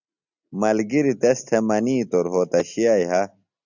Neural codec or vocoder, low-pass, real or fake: none; 7.2 kHz; real